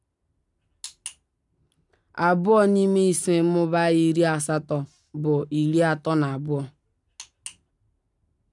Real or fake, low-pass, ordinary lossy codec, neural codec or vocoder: real; 10.8 kHz; none; none